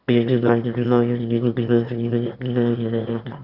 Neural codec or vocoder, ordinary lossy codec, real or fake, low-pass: autoencoder, 22.05 kHz, a latent of 192 numbers a frame, VITS, trained on one speaker; none; fake; 5.4 kHz